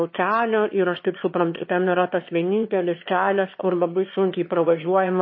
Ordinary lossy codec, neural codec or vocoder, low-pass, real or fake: MP3, 24 kbps; autoencoder, 22.05 kHz, a latent of 192 numbers a frame, VITS, trained on one speaker; 7.2 kHz; fake